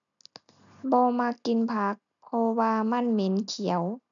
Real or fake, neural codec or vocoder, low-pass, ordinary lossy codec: real; none; 7.2 kHz; none